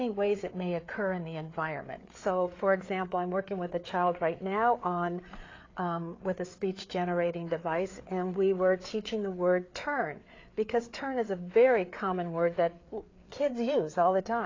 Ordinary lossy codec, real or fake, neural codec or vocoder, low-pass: AAC, 32 kbps; fake; codec, 16 kHz, 4 kbps, FreqCodec, larger model; 7.2 kHz